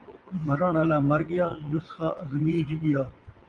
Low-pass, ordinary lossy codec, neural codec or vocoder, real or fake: 9.9 kHz; Opus, 32 kbps; vocoder, 22.05 kHz, 80 mel bands, WaveNeXt; fake